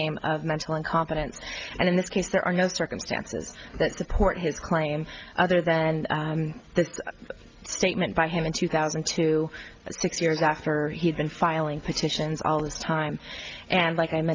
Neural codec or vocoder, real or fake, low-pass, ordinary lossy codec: none; real; 7.2 kHz; Opus, 24 kbps